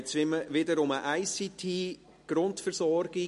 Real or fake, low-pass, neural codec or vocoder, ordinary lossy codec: real; 14.4 kHz; none; MP3, 48 kbps